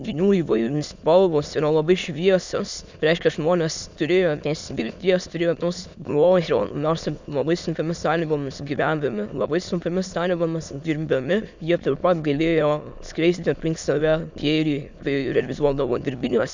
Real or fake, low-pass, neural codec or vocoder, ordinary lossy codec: fake; 7.2 kHz; autoencoder, 22.05 kHz, a latent of 192 numbers a frame, VITS, trained on many speakers; Opus, 64 kbps